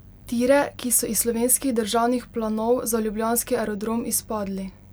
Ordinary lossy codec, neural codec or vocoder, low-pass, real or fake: none; none; none; real